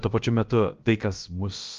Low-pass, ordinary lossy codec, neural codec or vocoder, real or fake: 7.2 kHz; Opus, 32 kbps; codec, 16 kHz, about 1 kbps, DyCAST, with the encoder's durations; fake